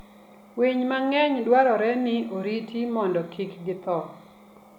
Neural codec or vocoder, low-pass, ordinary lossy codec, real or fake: none; 19.8 kHz; none; real